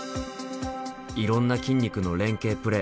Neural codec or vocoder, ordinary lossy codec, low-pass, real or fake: none; none; none; real